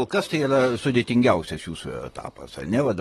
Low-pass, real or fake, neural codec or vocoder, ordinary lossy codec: 19.8 kHz; fake; vocoder, 44.1 kHz, 128 mel bands, Pupu-Vocoder; AAC, 32 kbps